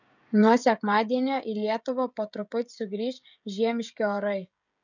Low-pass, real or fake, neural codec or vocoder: 7.2 kHz; fake; codec, 16 kHz, 16 kbps, FreqCodec, smaller model